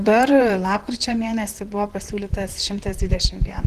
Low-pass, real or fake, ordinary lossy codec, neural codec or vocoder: 14.4 kHz; fake; Opus, 16 kbps; vocoder, 44.1 kHz, 128 mel bands, Pupu-Vocoder